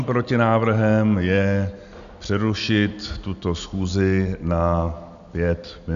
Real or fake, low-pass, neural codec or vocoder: real; 7.2 kHz; none